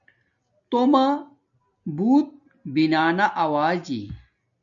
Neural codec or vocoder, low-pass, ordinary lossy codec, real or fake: none; 7.2 kHz; MP3, 64 kbps; real